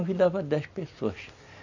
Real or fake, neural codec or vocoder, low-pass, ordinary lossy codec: real; none; 7.2 kHz; none